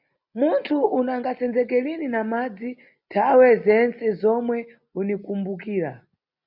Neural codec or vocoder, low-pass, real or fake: none; 5.4 kHz; real